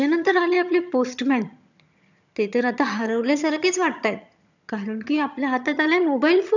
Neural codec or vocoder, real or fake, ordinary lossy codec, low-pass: vocoder, 22.05 kHz, 80 mel bands, HiFi-GAN; fake; none; 7.2 kHz